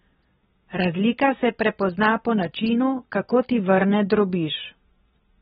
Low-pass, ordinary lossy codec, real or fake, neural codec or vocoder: 10.8 kHz; AAC, 16 kbps; real; none